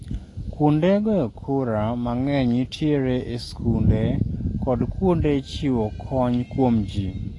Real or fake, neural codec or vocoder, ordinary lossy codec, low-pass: real; none; AAC, 32 kbps; 10.8 kHz